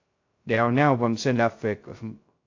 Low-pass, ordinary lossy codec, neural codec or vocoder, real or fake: 7.2 kHz; AAC, 48 kbps; codec, 16 kHz, 0.2 kbps, FocalCodec; fake